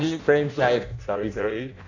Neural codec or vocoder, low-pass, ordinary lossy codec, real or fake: codec, 16 kHz in and 24 kHz out, 0.6 kbps, FireRedTTS-2 codec; 7.2 kHz; none; fake